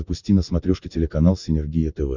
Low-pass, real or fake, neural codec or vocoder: 7.2 kHz; real; none